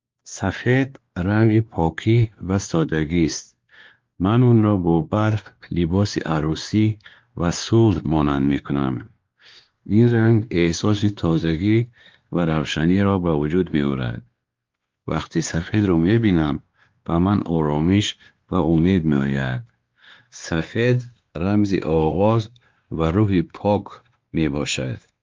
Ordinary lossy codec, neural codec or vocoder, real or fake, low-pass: Opus, 32 kbps; codec, 16 kHz, 2 kbps, X-Codec, WavLM features, trained on Multilingual LibriSpeech; fake; 7.2 kHz